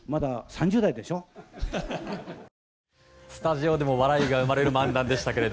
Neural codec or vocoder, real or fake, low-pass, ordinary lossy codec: none; real; none; none